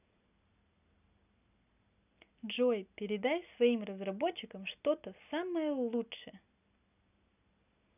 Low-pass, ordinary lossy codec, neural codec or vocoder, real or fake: 3.6 kHz; none; none; real